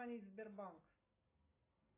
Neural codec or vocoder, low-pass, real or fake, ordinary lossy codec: none; 3.6 kHz; real; AAC, 16 kbps